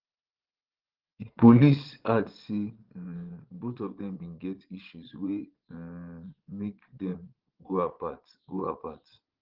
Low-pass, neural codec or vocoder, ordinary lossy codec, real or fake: 5.4 kHz; vocoder, 22.05 kHz, 80 mel bands, Vocos; Opus, 16 kbps; fake